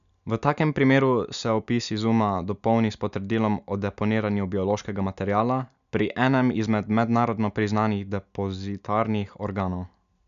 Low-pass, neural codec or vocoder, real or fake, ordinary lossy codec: 7.2 kHz; none; real; none